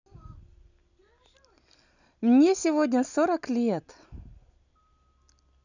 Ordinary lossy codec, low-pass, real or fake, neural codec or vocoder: none; 7.2 kHz; real; none